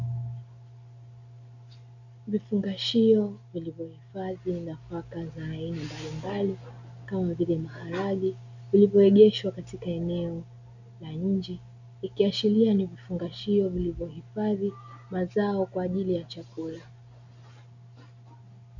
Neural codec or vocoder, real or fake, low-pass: none; real; 7.2 kHz